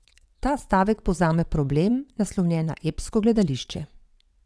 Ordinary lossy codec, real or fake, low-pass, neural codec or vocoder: none; fake; none; vocoder, 22.05 kHz, 80 mel bands, WaveNeXt